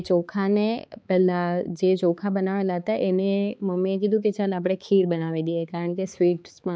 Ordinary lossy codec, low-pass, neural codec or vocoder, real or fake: none; none; codec, 16 kHz, 4 kbps, X-Codec, HuBERT features, trained on balanced general audio; fake